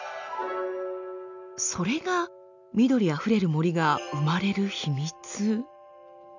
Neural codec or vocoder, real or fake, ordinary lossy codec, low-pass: none; real; none; 7.2 kHz